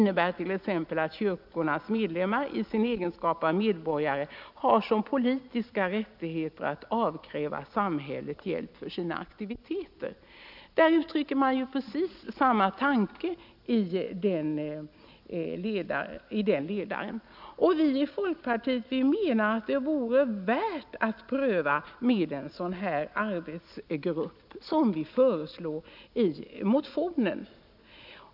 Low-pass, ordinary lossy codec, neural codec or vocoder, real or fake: 5.4 kHz; none; none; real